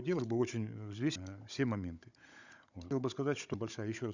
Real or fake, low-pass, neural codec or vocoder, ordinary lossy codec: fake; 7.2 kHz; codec, 16 kHz, 16 kbps, FunCodec, trained on Chinese and English, 50 frames a second; none